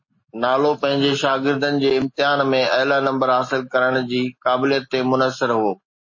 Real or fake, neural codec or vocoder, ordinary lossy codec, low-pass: real; none; MP3, 32 kbps; 7.2 kHz